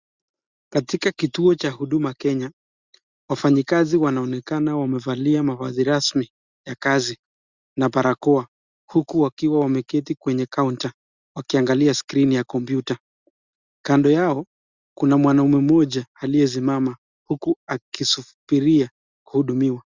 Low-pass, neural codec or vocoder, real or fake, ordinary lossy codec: 7.2 kHz; none; real; Opus, 64 kbps